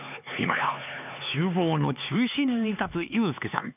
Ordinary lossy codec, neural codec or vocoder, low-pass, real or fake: none; codec, 16 kHz, 4 kbps, X-Codec, HuBERT features, trained on LibriSpeech; 3.6 kHz; fake